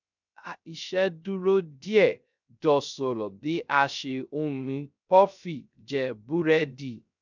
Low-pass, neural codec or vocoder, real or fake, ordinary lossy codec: 7.2 kHz; codec, 16 kHz, 0.3 kbps, FocalCodec; fake; none